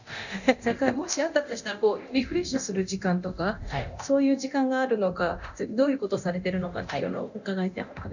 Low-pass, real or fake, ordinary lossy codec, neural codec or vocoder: 7.2 kHz; fake; none; codec, 24 kHz, 0.9 kbps, DualCodec